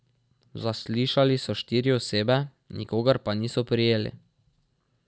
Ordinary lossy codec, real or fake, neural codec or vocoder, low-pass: none; real; none; none